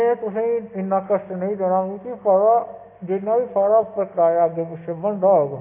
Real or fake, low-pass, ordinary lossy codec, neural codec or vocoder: real; 3.6 kHz; AAC, 24 kbps; none